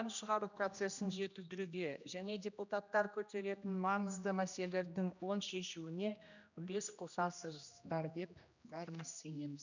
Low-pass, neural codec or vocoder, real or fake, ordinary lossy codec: 7.2 kHz; codec, 16 kHz, 1 kbps, X-Codec, HuBERT features, trained on general audio; fake; none